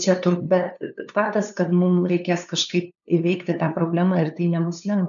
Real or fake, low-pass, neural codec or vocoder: fake; 7.2 kHz; codec, 16 kHz, 2 kbps, FunCodec, trained on LibriTTS, 25 frames a second